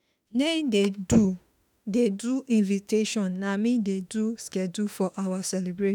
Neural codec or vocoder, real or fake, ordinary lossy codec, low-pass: autoencoder, 48 kHz, 32 numbers a frame, DAC-VAE, trained on Japanese speech; fake; none; none